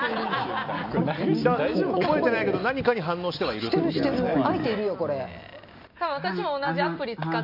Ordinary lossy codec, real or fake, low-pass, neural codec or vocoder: none; real; 5.4 kHz; none